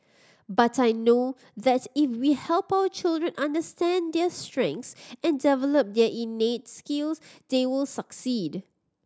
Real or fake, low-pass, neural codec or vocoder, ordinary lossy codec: real; none; none; none